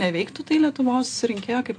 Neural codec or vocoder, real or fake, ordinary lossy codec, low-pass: none; real; AAC, 48 kbps; 9.9 kHz